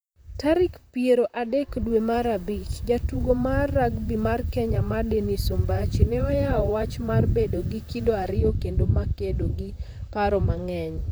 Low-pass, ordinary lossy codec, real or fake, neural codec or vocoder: none; none; fake; vocoder, 44.1 kHz, 128 mel bands, Pupu-Vocoder